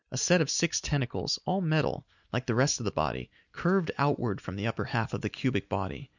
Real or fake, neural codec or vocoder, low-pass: real; none; 7.2 kHz